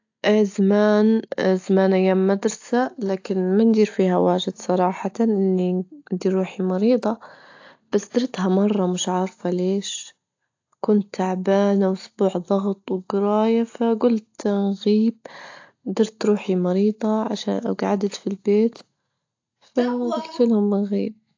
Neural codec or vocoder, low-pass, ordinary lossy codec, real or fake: none; 7.2 kHz; AAC, 48 kbps; real